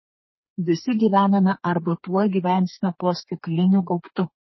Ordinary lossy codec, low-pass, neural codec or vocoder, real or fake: MP3, 24 kbps; 7.2 kHz; codec, 32 kHz, 1.9 kbps, SNAC; fake